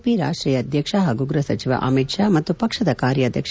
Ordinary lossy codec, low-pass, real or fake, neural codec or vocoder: none; none; real; none